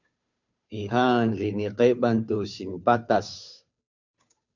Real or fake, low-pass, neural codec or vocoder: fake; 7.2 kHz; codec, 16 kHz, 2 kbps, FunCodec, trained on Chinese and English, 25 frames a second